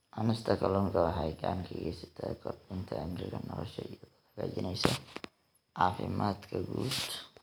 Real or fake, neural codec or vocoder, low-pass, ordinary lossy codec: real; none; none; none